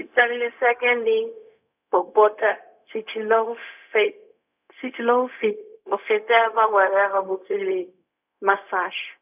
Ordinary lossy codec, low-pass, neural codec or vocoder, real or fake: none; 3.6 kHz; codec, 16 kHz, 0.4 kbps, LongCat-Audio-Codec; fake